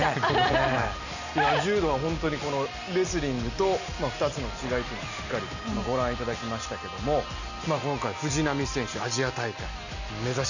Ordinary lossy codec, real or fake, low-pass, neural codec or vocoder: none; real; 7.2 kHz; none